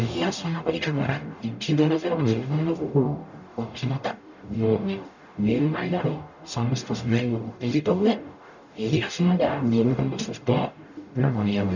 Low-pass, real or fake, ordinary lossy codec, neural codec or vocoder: 7.2 kHz; fake; none; codec, 44.1 kHz, 0.9 kbps, DAC